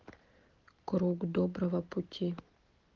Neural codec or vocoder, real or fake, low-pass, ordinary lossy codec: none; real; 7.2 kHz; Opus, 16 kbps